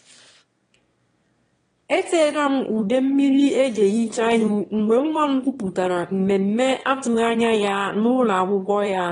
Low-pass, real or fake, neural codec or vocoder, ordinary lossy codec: 9.9 kHz; fake; autoencoder, 22.05 kHz, a latent of 192 numbers a frame, VITS, trained on one speaker; AAC, 32 kbps